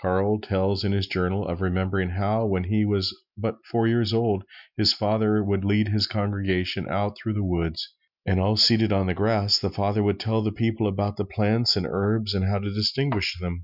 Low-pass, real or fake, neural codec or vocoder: 5.4 kHz; real; none